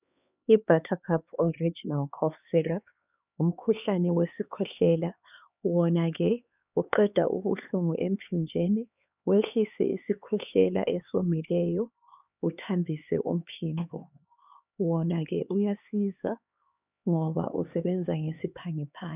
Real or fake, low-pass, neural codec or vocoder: fake; 3.6 kHz; codec, 16 kHz, 4 kbps, X-Codec, HuBERT features, trained on LibriSpeech